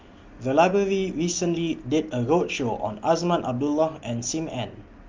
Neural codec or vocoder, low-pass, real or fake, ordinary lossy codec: none; 7.2 kHz; real; Opus, 32 kbps